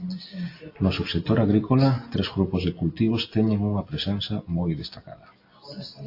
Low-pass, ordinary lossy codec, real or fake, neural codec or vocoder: 5.4 kHz; AAC, 32 kbps; real; none